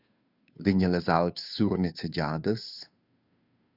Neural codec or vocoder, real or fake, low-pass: codec, 16 kHz, 2 kbps, FunCodec, trained on Chinese and English, 25 frames a second; fake; 5.4 kHz